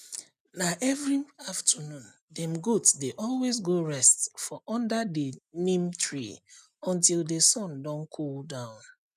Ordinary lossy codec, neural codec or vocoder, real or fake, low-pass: none; none; real; 14.4 kHz